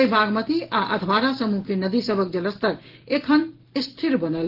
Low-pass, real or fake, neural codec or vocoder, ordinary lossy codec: 5.4 kHz; real; none; Opus, 16 kbps